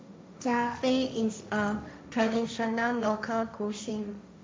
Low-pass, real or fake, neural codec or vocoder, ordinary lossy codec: none; fake; codec, 16 kHz, 1.1 kbps, Voila-Tokenizer; none